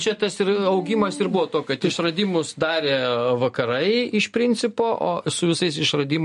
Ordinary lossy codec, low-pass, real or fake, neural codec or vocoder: MP3, 48 kbps; 14.4 kHz; real; none